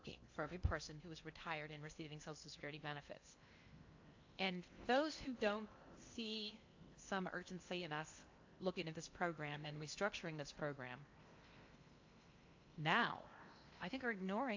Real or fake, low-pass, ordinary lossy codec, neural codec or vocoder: fake; 7.2 kHz; AAC, 48 kbps; codec, 16 kHz in and 24 kHz out, 0.8 kbps, FocalCodec, streaming, 65536 codes